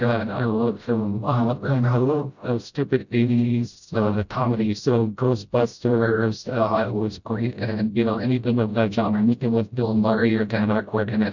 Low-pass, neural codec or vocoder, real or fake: 7.2 kHz; codec, 16 kHz, 0.5 kbps, FreqCodec, smaller model; fake